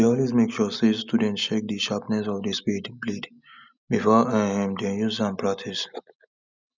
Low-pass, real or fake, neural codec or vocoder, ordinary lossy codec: 7.2 kHz; real; none; none